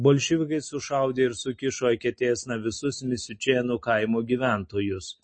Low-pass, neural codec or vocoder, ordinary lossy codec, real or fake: 9.9 kHz; none; MP3, 32 kbps; real